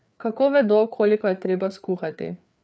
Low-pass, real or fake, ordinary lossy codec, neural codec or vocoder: none; fake; none; codec, 16 kHz, 4 kbps, FreqCodec, larger model